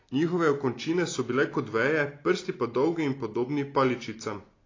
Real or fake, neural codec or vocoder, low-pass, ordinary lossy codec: real; none; 7.2 kHz; AAC, 32 kbps